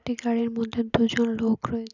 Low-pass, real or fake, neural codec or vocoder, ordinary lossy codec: 7.2 kHz; real; none; none